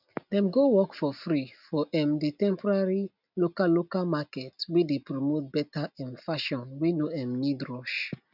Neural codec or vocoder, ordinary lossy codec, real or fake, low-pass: none; none; real; 5.4 kHz